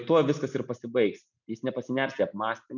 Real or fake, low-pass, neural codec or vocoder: real; 7.2 kHz; none